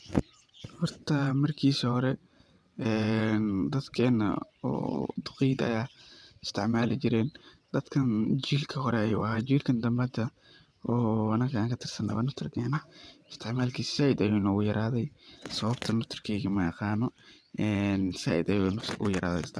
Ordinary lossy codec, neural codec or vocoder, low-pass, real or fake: none; vocoder, 22.05 kHz, 80 mel bands, WaveNeXt; none; fake